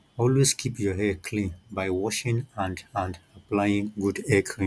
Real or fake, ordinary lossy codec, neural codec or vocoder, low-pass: real; none; none; none